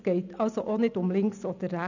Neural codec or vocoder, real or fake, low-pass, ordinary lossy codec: none; real; 7.2 kHz; none